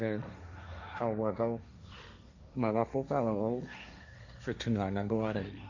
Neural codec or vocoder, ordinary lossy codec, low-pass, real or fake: codec, 16 kHz, 1.1 kbps, Voila-Tokenizer; none; 7.2 kHz; fake